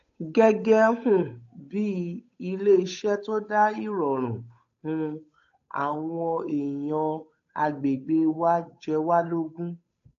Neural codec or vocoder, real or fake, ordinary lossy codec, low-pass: codec, 16 kHz, 8 kbps, FunCodec, trained on Chinese and English, 25 frames a second; fake; AAC, 48 kbps; 7.2 kHz